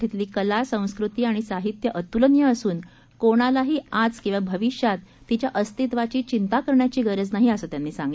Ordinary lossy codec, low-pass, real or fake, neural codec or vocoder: none; none; real; none